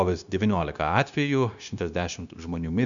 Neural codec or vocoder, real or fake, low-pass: codec, 16 kHz, 0.9 kbps, LongCat-Audio-Codec; fake; 7.2 kHz